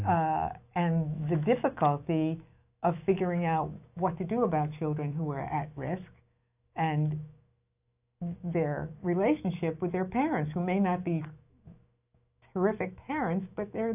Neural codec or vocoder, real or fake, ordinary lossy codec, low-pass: autoencoder, 48 kHz, 128 numbers a frame, DAC-VAE, trained on Japanese speech; fake; AAC, 32 kbps; 3.6 kHz